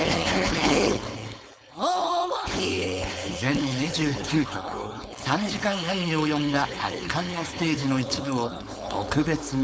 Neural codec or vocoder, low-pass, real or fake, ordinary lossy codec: codec, 16 kHz, 4.8 kbps, FACodec; none; fake; none